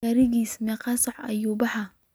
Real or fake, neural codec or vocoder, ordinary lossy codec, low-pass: real; none; none; none